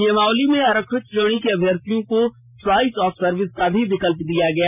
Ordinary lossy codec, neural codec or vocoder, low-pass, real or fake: none; none; 3.6 kHz; real